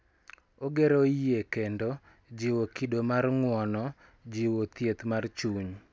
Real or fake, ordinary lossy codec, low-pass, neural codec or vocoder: real; none; none; none